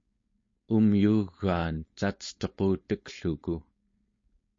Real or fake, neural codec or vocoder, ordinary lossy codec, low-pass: fake; codec, 16 kHz, 4.8 kbps, FACodec; MP3, 32 kbps; 7.2 kHz